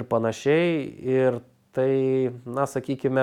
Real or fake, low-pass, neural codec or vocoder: real; 19.8 kHz; none